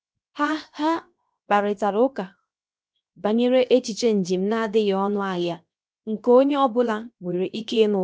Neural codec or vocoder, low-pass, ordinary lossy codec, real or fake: codec, 16 kHz, 0.7 kbps, FocalCodec; none; none; fake